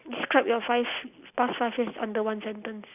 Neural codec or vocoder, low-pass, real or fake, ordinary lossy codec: none; 3.6 kHz; real; none